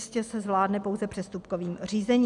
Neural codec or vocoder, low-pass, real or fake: none; 10.8 kHz; real